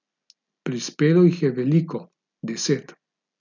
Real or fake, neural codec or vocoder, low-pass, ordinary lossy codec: real; none; 7.2 kHz; none